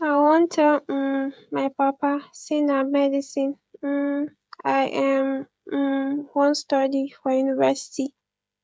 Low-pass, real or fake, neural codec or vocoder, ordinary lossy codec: none; fake; codec, 16 kHz, 16 kbps, FreqCodec, smaller model; none